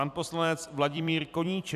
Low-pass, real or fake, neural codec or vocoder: 14.4 kHz; fake; vocoder, 44.1 kHz, 128 mel bands every 256 samples, BigVGAN v2